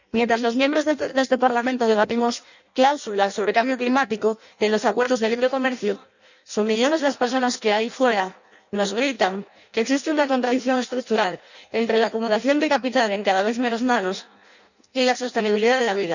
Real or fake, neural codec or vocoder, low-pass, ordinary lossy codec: fake; codec, 16 kHz in and 24 kHz out, 0.6 kbps, FireRedTTS-2 codec; 7.2 kHz; none